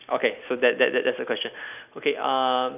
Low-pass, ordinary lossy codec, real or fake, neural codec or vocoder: 3.6 kHz; none; real; none